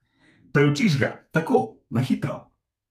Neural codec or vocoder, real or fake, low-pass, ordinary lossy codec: codec, 32 kHz, 1.9 kbps, SNAC; fake; 14.4 kHz; none